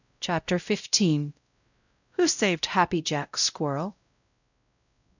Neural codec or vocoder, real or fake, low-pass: codec, 16 kHz, 0.5 kbps, X-Codec, WavLM features, trained on Multilingual LibriSpeech; fake; 7.2 kHz